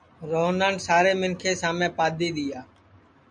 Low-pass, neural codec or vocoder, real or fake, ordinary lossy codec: 9.9 kHz; none; real; MP3, 64 kbps